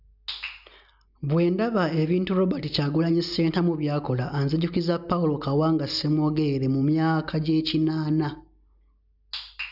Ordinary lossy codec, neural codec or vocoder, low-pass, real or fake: none; none; 5.4 kHz; real